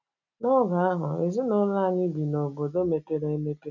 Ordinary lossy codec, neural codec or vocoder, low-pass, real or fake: none; none; 7.2 kHz; real